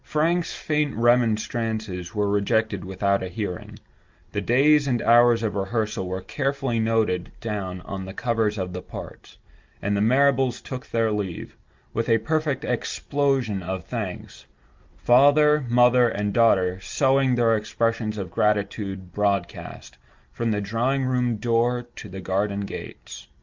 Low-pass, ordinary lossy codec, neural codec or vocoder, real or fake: 7.2 kHz; Opus, 24 kbps; none; real